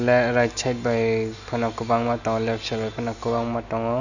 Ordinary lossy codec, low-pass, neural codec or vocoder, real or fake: none; 7.2 kHz; none; real